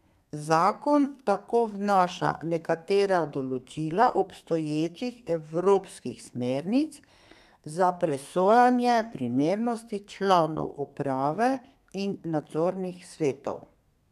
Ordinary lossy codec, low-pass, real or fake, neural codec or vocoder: none; 14.4 kHz; fake; codec, 32 kHz, 1.9 kbps, SNAC